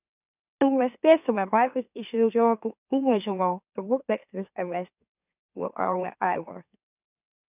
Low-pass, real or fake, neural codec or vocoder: 3.6 kHz; fake; autoencoder, 44.1 kHz, a latent of 192 numbers a frame, MeloTTS